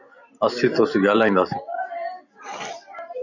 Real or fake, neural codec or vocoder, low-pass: fake; vocoder, 44.1 kHz, 128 mel bands every 256 samples, BigVGAN v2; 7.2 kHz